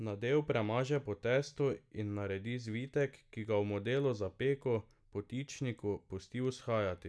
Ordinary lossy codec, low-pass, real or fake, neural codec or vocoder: none; none; real; none